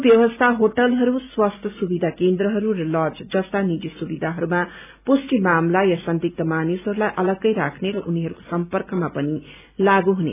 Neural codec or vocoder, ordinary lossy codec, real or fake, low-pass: none; none; real; 3.6 kHz